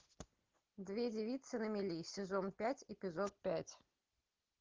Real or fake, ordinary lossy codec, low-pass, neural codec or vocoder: real; Opus, 16 kbps; 7.2 kHz; none